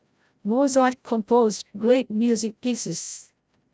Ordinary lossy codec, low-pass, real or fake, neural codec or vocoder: none; none; fake; codec, 16 kHz, 0.5 kbps, FreqCodec, larger model